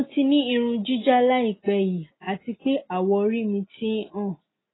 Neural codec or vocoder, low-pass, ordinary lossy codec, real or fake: none; 7.2 kHz; AAC, 16 kbps; real